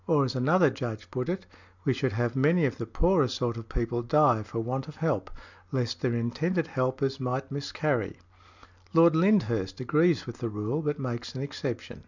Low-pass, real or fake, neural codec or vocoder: 7.2 kHz; real; none